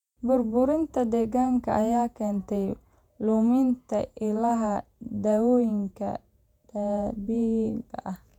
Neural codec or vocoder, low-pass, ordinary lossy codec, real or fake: vocoder, 48 kHz, 128 mel bands, Vocos; 19.8 kHz; none; fake